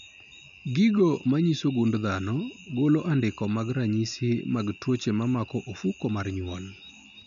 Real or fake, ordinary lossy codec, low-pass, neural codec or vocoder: real; none; 7.2 kHz; none